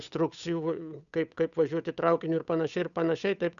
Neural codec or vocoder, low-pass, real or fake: codec, 16 kHz, 8 kbps, FunCodec, trained on Chinese and English, 25 frames a second; 7.2 kHz; fake